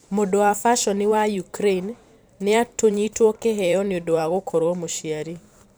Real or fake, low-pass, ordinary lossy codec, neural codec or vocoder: fake; none; none; vocoder, 44.1 kHz, 128 mel bands every 512 samples, BigVGAN v2